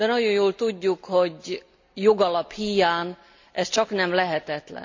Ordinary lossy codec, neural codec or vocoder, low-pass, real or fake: MP3, 64 kbps; none; 7.2 kHz; real